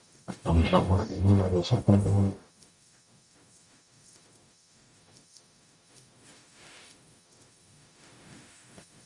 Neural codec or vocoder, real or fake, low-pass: codec, 44.1 kHz, 0.9 kbps, DAC; fake; 10.8 kHz